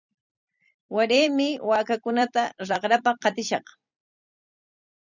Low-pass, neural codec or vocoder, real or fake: 7.2 kHz; vocoder, 44.1 kHz, 128 mel bands every 256 samples, BigVGAN v2; fake